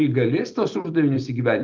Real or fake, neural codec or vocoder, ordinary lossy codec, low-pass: real; none; Opus, 32 kbps; 7.2 kHz